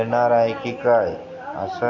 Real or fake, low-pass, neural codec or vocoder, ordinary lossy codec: real; 7.2 kHz; none; none